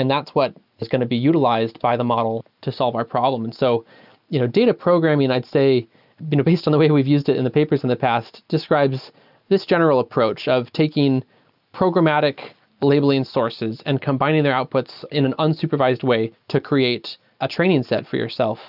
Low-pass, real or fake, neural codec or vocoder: 5.4 kHz; real; none